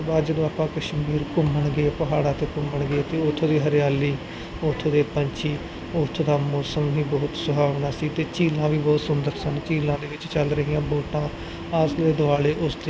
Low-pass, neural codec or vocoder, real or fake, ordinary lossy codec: none; none; real; none